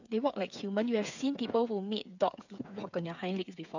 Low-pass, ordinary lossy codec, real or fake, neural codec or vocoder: 7.2 kHz; none; fake; codec, 16 kHz, 8 kbps, FreqCodec, smaller model